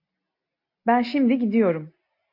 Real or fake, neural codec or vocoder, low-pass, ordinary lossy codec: real; none; 5.4 kHz; AAC, 32 kbps